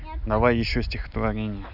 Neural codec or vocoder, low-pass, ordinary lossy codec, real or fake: none; 5.4 kHz; none; real